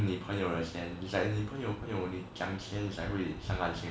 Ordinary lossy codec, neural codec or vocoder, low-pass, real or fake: none; none; none; real